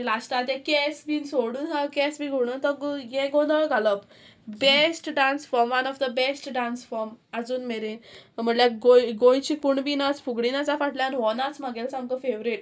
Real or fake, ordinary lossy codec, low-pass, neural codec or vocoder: real; none; none; none